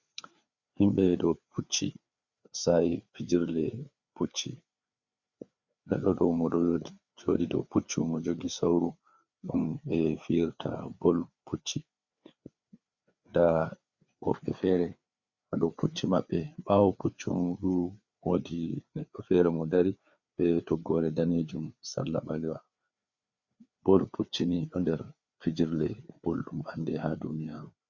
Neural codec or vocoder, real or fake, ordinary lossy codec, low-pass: codec, 16 kHz, 4 kbps, FreqCodec, larger model; fake; Opus, 64 kbps; 7.2 kHz